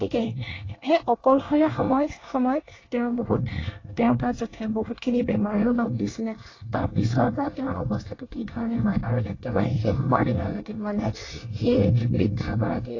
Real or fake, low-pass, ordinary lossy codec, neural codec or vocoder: fake; 7.2 kHz; AAC, 32 kbps; codec, 24 kHz, 1 kbps, SNAC